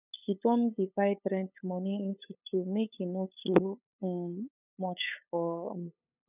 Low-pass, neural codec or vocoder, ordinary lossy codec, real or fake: 3.6 kHz; codec, 16 kHz, 8 kbps, FunCodec, trained on LibriTTS, 25 frames a second; none; fake